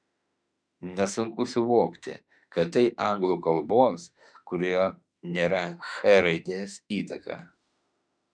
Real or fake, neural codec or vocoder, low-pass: fake; autoencoder, 48 kHz, 32 numbers a frame, DAC-VAE, trained on Japanese speech; 9.9 kHz